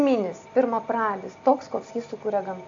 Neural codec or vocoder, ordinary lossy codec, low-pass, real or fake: none; AAC, 48 kbps; 7.2 kHz; real